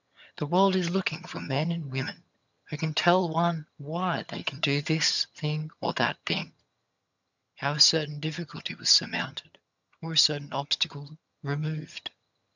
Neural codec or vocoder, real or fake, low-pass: vocoder, 22.05 kHz, 80 mel bands, HiFi-GAN; fake; 7.2 kHz